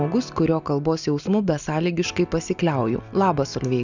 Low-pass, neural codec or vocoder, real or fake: 7.2 kHz; none; real